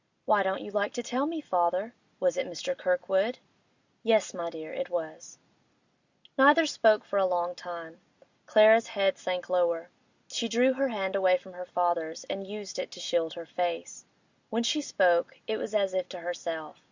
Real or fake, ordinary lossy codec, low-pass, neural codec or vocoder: real; Opus, 64 kbps; 7.2 kHz; none